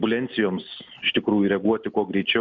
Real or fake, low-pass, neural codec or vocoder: real; 7.2 kHz; none